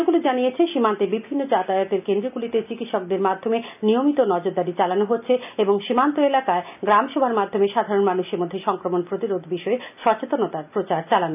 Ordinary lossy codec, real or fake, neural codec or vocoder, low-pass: none; real; none; 3.6 kHz